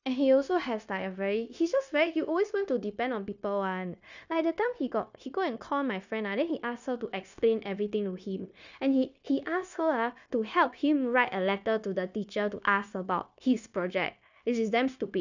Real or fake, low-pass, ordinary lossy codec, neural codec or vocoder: fake; 7.2 kHz; none; codec, 16 kHz, 0.9 kbps, LongCat-Audio-Codec